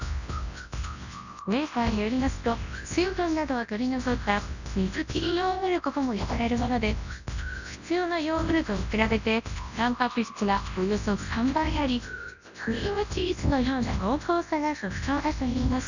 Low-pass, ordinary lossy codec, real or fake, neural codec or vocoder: 7.2 kHz; none; fake; codec, 24 kHz, 0.9 kbps, WavTokenizer, large speech release